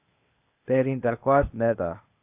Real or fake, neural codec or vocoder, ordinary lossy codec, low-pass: fake; codec, 16 kHz, 0.8 kbps, ZipCodec; AAC, 32 kbps; 3.6 kHz